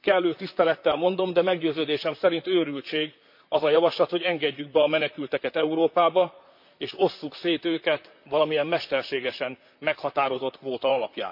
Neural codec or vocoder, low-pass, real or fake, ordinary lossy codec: vocoder, 44.1 kHz, 128 mel bands, Pupu-Vocoder; 5.4 kHz; fake; MP3, 48 kbps